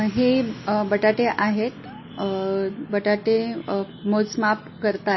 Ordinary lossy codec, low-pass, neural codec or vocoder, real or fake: MP3, 24 kbps; 7.2 kHz; none; real